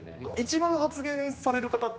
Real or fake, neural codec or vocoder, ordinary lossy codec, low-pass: fake; codec, 16 kHz, 2 kbps, X-Codec, HuBERT features, trained on general audio; none; none